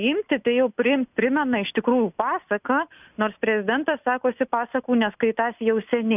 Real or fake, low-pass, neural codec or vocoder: real; 3.6 kHz; none